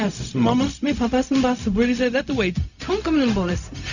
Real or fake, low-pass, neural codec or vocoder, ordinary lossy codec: fake; 7.2 kHz; codec, 16 kHz, 0.4 kbps, LongCat-Audio-Codec; none